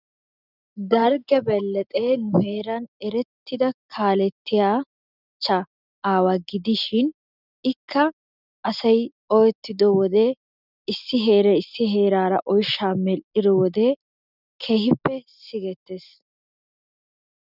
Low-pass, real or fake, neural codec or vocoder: 5.4 kHz; real; none